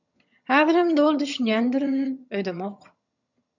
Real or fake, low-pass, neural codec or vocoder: fake; 7.2 kHz; vocoder, 22.05 kHz, 80 mel bands, HiFi-GAN